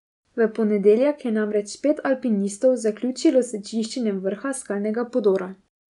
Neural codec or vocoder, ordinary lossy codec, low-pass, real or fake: none; none; 10.8 kHz; real